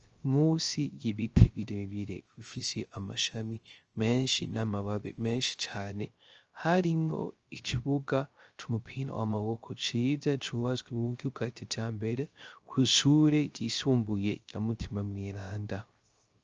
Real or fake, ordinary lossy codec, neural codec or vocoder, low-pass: fake; Opus, 24 kbps; codec, 16 kHz, 0.3 kbps, FocalCodec; 7.2 kHz